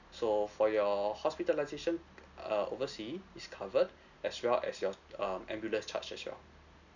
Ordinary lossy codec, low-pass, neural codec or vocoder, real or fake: none; 7.2 kHz; none; real